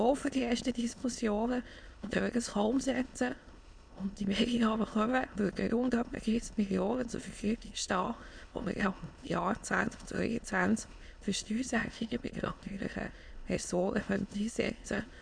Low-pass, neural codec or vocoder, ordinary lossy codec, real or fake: 9.9 kHz; autoencoder, 22.05 kHz, a latent of 192 numbers a frame, VITS, trained on many speakers; none; fake